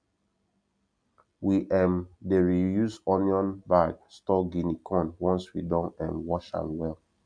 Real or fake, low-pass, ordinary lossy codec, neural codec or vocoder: real; 9.9 kHz; none; none